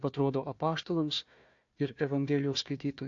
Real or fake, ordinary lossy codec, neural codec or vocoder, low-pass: fake; AAC, 48 kbps; codec, 16 kHz, 1 kbps, FunCodec, trained on Chinese and English, 50 frames a second; 7.2 kHz